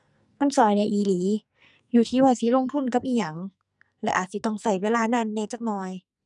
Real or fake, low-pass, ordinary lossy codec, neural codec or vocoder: fake; 10.8 kHz; none; codec, 44.1 kHz, 2.6 kbps, SNAC